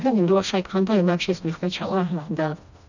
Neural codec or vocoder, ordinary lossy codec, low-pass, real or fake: codec, 16 kHz, 1 kbps, FreqCodec, smaller model; none; 7.2 kHz; fake